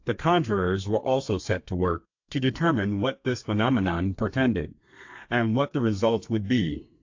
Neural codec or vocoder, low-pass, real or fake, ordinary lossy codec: codec, 32 kHz, 1.9 kbps, SNAC; 7.2 kHz; fake; AAC, 48 kbps